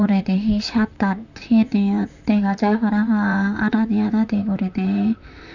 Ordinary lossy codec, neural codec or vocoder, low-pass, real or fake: none; vocoder, 44.1 kHz, 128 mel bands, Pupu-Vocoder; 7.2 kHz; fake